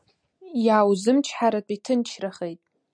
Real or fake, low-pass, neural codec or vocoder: real; 9.9 kHz; none